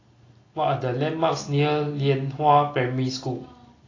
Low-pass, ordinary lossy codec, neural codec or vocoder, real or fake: 7.2 kHz; AAC, 32 kbps; none; real